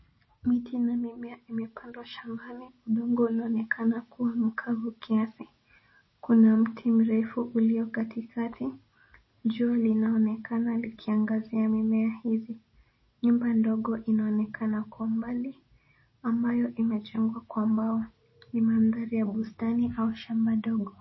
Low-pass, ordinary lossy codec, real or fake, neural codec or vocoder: 7.2 kHz; MP3, 24 kbps; real; none